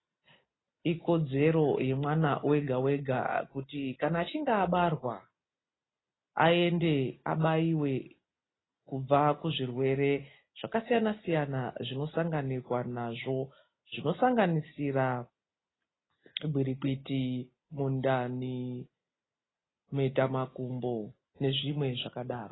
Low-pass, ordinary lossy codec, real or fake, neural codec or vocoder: 7.2 kHz; AAC, 16 kbps; real; none